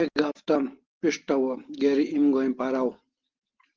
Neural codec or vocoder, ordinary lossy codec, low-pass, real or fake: none; Opus, 16 kbps; 7.2 kHz; real